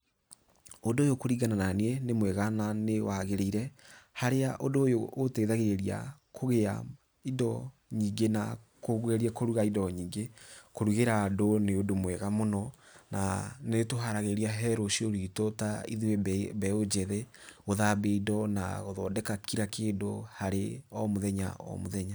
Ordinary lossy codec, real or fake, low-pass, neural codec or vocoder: none; real; none; none